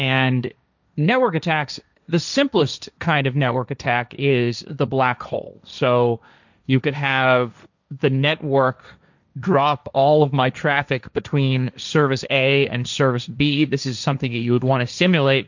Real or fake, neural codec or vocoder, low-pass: fake; codec, 16 kHz, 1.1 kbps, Voila-Tokenizer; 7.2 kHz